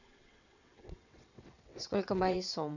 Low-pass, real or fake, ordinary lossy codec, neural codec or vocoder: 7.2 kHz; fake; none; vocoder, 22.05 kHz, 80 mel bands, WaveNeXt